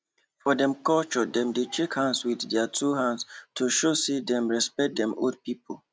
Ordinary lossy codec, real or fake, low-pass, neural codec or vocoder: none; real; none; none